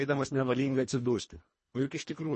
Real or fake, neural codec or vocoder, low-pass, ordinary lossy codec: fake; codec, 24 kHz, 1.5 kbps, HILCodec; 10.8 kHz; MP3, 32 kbps